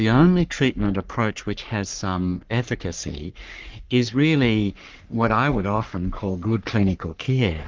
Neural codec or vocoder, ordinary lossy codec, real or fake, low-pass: codec, 44.1 kHz, 3.4 kbps, Pupu-Codec; Opus, 32 kbps; fake; 7.2 kHz